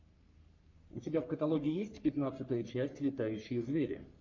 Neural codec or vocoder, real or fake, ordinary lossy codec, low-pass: codec, 44.1 kHz, 3.4 kbps, Pupu-Codec; fake; MP3, 48 kbps; 7.2 kHz